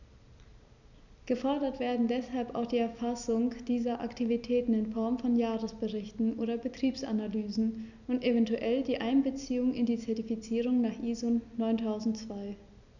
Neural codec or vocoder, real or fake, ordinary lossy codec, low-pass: none; real; none; 7.2 kHz